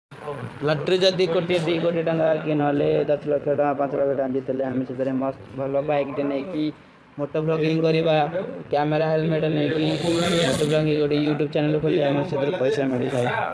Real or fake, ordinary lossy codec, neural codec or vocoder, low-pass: fake; none; vocoder, 22.05 kHz, 80 mel bands, Vocos; none